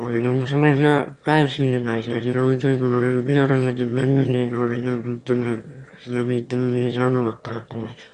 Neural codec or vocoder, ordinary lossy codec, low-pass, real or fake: autoencoder, 22.05 kHz, a latent of 192 numbers a frame, VITS, trained on one speaker; Opus, 64 kbps; 9.9 kHz; fake